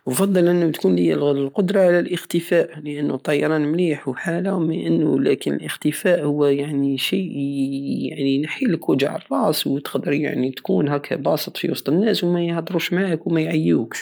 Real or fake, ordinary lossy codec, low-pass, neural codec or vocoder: real; none; none; none